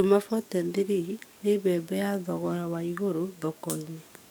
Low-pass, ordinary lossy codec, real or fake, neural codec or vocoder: none; none; fake; codec, 44.1 kHz, 7.8 kbps, DAC